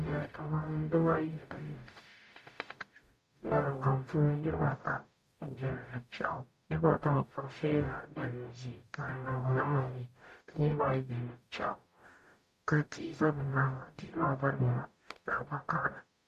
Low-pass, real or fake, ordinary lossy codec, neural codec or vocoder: 14.4 kHz; fake; MP3, 64 kbps; codec, 44.1 kHz, 0.9 kbps, DAC